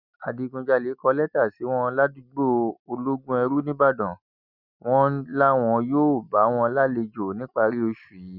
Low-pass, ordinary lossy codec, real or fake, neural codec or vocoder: 5.4 kHz; none; real; none